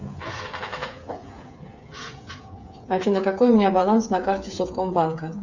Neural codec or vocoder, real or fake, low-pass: codec, 16 kHz, 8 kbps, FreqCodec, smaller model; fake; 7.2 kHz